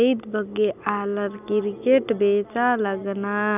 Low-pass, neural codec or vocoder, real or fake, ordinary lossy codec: 3.6 kHz; none; real; none